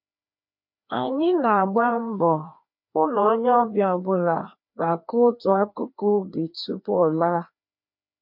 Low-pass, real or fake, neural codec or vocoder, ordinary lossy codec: 5.4 kHz; fake; codec, 16 kHz, 2 kbps, FreqCodec, larger model; MP3, 48 kbps